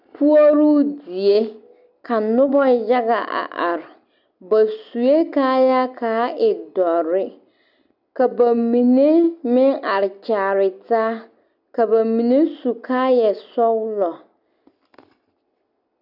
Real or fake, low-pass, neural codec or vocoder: real; 5.4 kHz; none